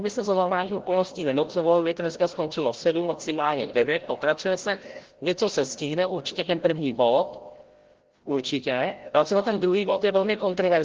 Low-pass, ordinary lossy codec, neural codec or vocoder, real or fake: 7.2 kHz; Opus, 16 kbps; codec, 16 kHz, 0.5 kbps, FreqCodec, larger model; fake